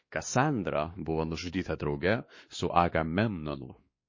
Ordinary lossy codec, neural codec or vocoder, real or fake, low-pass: MP3, 32 kbps; codec, 16 kHz, 2 kbps, X-Codec, WavLM features, trained on Multilingual LibriSpeech; fake; 7.2 kHz